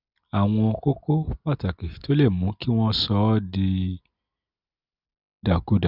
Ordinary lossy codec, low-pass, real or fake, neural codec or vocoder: none; 5.4 kHz; real; none